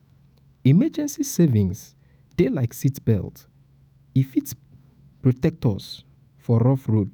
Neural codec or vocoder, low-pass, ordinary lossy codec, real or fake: autoencoder, 48 kHz, 128 numbers a frame, DAC-VAE, trained on Japanese speech; none; none; fake